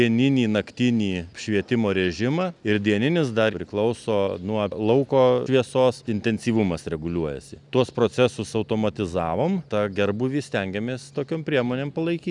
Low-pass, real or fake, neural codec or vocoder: 10.8 kHz; real; none